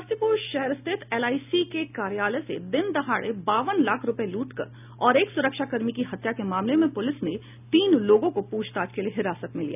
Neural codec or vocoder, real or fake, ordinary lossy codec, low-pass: none; real; none; 3.6 kHz